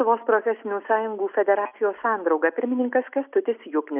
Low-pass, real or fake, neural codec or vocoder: 3.6 kHz; real; none